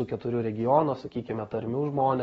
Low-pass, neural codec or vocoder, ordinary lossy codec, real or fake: 19.8 kHz; none; AAC, 24 kbps; real